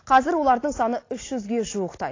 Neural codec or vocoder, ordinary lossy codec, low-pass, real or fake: none; AAC, 32 kbps; 7.2 kHz; real